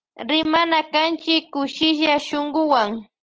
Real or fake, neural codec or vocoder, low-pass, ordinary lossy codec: real; none; 7.2 kHz; Opus, 16 kbps